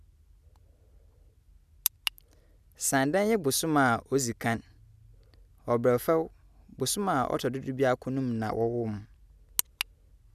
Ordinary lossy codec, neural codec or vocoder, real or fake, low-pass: none; none; real; 14.4 kHz